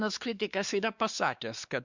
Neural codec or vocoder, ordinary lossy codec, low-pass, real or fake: codec, 16 kHz, 2 kbps, X-Codec, WavLM features, trained on Multilingual LibriSpeech; Opus, 64 kbps; 7.2 kHz; fake